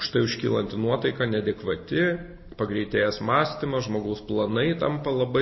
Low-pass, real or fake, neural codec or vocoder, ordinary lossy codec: 7.2 kHz; real; none; MP3, 24 kbps